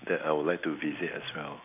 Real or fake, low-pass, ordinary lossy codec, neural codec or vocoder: real; 3.6 kHz; none; none